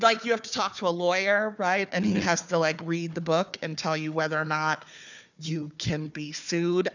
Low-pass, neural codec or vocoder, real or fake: 7.2 kHz; codec, 16 kHz, 4 kbps, FunCodec, trained on Chinese and English, 50 frames a second; fake